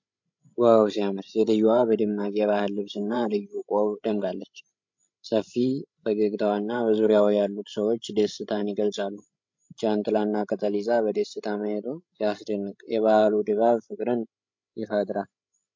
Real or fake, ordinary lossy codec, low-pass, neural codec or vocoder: fake; MP3, 48 kbps; 7.2 kHz; codec, 16 kHz, 16 kbps, FreqCodec, larger model